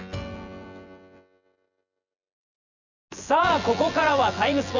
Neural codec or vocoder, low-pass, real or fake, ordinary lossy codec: vocoder, 24 kHz, 100 mel bands, Vocos; 7.2 kHz; fake; none